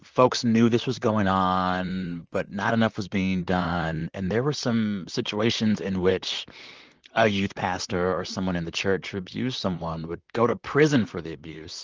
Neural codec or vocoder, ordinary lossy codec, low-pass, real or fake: vocoder, 44.1 kHz, 128 mel bands, Pupu-Vocoder; Opus, 24 kbps; 7.2 kHz; fake